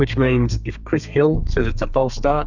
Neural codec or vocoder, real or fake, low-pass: codec, 32 kHz, 1.9 kbps, SNAC; fake; 7.2 kHz